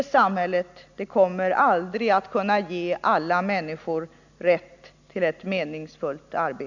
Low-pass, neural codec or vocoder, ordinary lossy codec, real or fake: 7.2 kHz; none; none; real